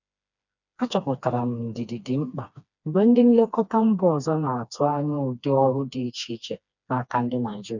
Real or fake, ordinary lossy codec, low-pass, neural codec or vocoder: fake; none; 7.2 kHz; codec, 16 kHz, 2 kbps, FreqCodec, smaller model